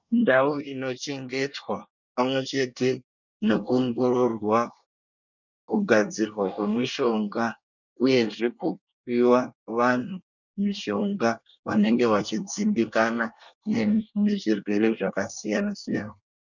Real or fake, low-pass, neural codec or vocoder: fake; 7.2 kHz; codec, 24 kHz, 1 kbps, SNAC